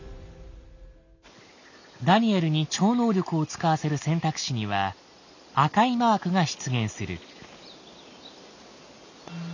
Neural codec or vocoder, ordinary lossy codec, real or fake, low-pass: none; none; real; 7.2 kHz